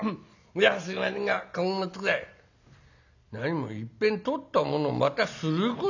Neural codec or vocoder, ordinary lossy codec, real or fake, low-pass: none; none; real; 7.2 kHz